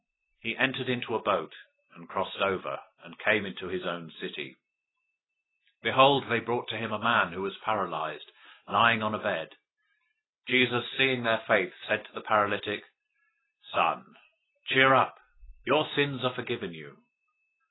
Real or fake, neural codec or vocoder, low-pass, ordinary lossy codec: real; none; 7.2 kHz; AAC, 16 kbps